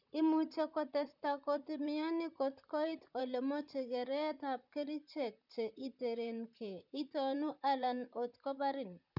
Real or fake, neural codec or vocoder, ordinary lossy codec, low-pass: fake; vocoder, 44.1 kHz, 128 mel bands, Pupu-Vocoder; none; 5.4 kHz